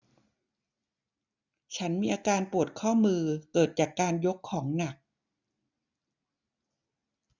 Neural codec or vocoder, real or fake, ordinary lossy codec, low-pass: none; real; none; 7.2 kHz